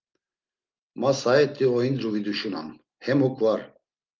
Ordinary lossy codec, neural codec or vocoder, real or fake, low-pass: Opus, 32 kbps; none; real; 7.2 kHz